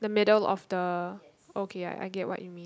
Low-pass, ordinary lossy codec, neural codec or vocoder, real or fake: none; none; none; real